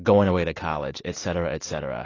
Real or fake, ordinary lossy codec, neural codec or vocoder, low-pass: real; AAC, 32 kbps; none; 7.2 kHz